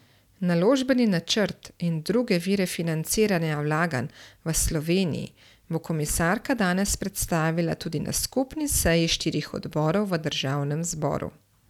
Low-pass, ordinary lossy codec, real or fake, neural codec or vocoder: 19.8 kHz; none; real; none